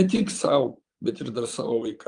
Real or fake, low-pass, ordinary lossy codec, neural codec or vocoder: fake; 9.9 kHz; Opus, 32 kbps; vocoder, 22.05 kHz, 80 mel bands, Vocos